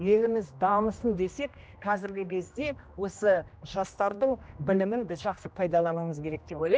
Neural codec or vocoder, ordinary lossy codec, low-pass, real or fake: codec, 16 kHz, 1 kbps, X-Codec, HuBERT features, trained on general audio; none; none; fake